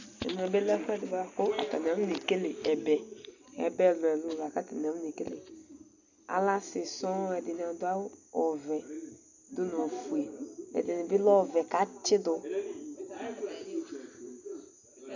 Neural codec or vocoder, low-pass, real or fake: none; 7.2 kHz; real